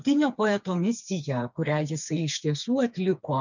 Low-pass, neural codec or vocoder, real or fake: 7.2 kHz; codec, 16 kHz, 4 kbps, FreqCodec, smaller model; fake